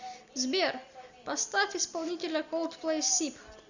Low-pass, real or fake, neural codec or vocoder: 7.2 kHz; real; none